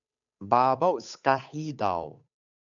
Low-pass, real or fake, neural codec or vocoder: 7.2 kHz; fake; codec, 16 kHz, 8 kbps, FunCodec, trained on Chinese and English, 25 frames a second